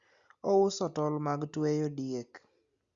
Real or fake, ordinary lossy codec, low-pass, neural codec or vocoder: real; Opus, 64 kbps; 7.2 kHz; none